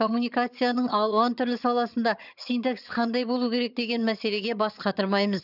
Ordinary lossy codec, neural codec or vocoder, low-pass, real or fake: none; vocoder, 22.05 kHz, 80 mel bands, HiFi-GAN; 5.4 kHz; fake